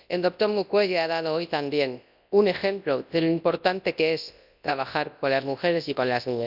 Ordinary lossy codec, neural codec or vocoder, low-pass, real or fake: none; codec, 24 kHz, 0.9 kbps, WavTokenizer, large speech release; 5.4 kHz; fake